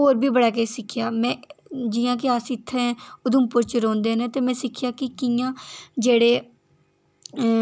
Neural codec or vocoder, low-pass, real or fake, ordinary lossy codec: none; none; real; none